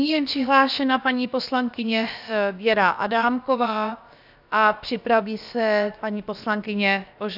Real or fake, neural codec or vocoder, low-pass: fake; codec, 16 kHz, about 1 kbps, DyCAST, with the encoder's durations; 5.4 kHz